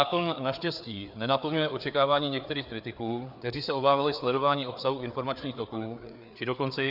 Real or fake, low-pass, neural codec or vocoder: fake; 5.4 kHz; codec, 16 kHz, 4 kbps, FreqCodec, larger model